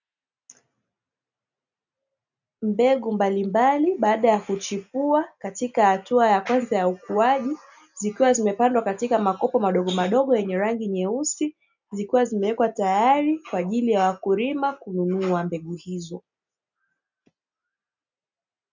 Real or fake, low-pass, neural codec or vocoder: real; 7.2 kHz; none